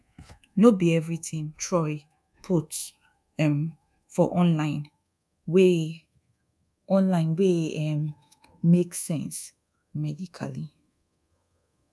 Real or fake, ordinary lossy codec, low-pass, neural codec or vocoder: fake; none; none; codec, 24 kHz, 1.2 kbps, DualCodec